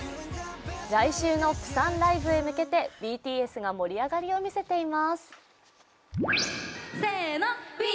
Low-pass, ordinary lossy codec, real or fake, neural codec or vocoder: none; none; real; none